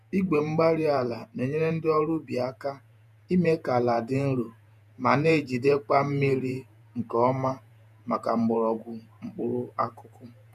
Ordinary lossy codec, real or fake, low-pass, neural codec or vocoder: none; fake; 14.4 kHz; vocoder, 48 kHz, 128 mel bands, Vocos